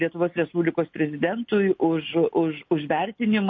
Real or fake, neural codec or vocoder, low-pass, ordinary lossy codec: real; none; 7.2 kHz; MP3, 32 kbps